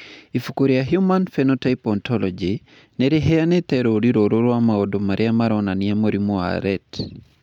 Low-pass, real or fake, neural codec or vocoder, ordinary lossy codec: 19.8 kHz; real; none; none